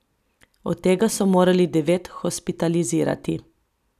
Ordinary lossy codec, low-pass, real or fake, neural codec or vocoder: none; 14.4 kHz; real; none